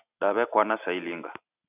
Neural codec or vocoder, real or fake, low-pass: none; real; 3.6 kHz